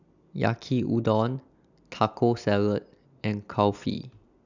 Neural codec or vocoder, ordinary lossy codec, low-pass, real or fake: none; none; 7.2 kHz; real